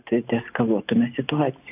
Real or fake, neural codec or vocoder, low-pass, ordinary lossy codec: real; none; 3.6 kHz; AAC, 32 kbps